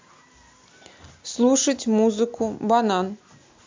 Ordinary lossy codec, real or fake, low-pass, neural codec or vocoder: MP3, 64 kbps; real; 7.2 kHz; none